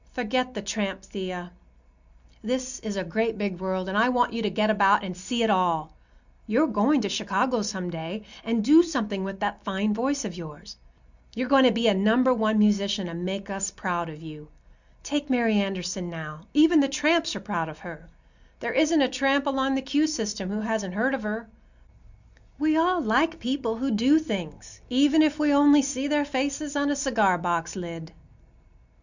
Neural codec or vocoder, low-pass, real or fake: none; 7.2 kHz; real